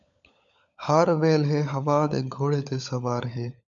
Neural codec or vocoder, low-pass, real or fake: codec, 16 kHz, 16 kbps, FunCodec, trained on LibriTTS, 50 frames a second; 7.2 kHz; fake